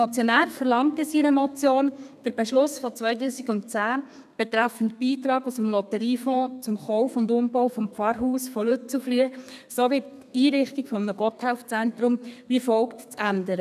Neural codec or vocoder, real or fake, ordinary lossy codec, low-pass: codec, 32 kHz, 1.9 kbps, SNAC; fake; none; 14.4 kHz